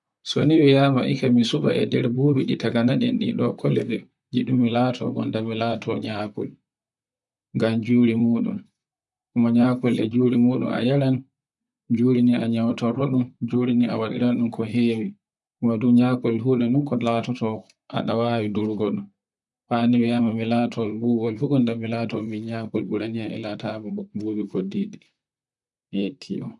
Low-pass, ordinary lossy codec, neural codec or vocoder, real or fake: 10.8 kHz; none; vocoder, 44.1 kHz, 128 mel bands, Pupu-Vocoder; fake